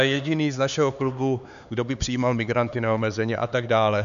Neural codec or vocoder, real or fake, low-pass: codec, 16 kHz, 4 kbps, X-Codec, HuBERT features, trained on LibriSpeech; fake; 7.2 kHz